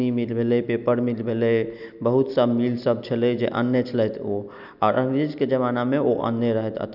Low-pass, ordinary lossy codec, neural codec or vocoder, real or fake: 5.4 kHz; none; none; real